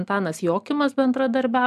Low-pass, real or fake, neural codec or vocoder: 14.4 kHz; real; none